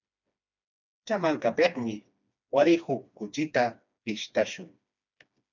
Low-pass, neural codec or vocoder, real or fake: 7.2 kHz; codec, 16 kHz, 2 kbps, FreqCodec, smaller model; fake